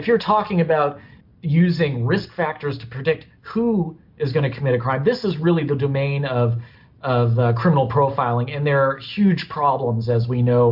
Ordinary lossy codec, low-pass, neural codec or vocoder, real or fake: MP3, 48 kbps; 5.4 kHz; none; real